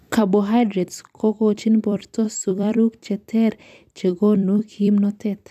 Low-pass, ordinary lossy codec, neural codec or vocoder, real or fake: 14.4 kHz; AAC, 96 kbps; vocoder, 44.1 kHz, 128 mel bands every 256 samples, BigVGAN v2; fake